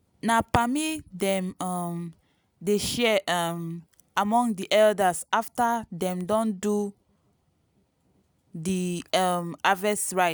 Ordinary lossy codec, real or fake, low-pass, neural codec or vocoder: none; real; none; none